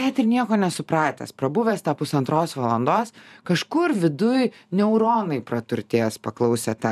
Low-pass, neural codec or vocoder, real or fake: 14.4 kHz; vocoder, 44.1 kHz, 128 mel bands every 512 samples, BigVGAN v2; fake